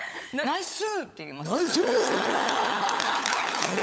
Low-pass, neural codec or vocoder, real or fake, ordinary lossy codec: none; codec, 16 kHz, 16 kbps, FunCodec, trained on LibriTTS, 50 frames a second; fake; none